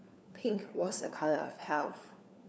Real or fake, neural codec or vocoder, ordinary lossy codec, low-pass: fake; codec, 16 kHz, 4 kbps, FunCodec, trained on LibriTTS, 50 frames a second; none; none